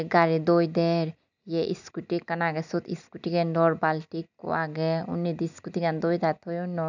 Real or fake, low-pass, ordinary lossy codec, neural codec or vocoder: real; 7.2 kHz; none; none